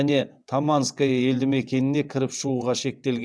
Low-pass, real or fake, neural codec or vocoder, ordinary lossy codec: none; fake; vocoder, 22.05 kHz, 80 mel bands, WaveNeXt; none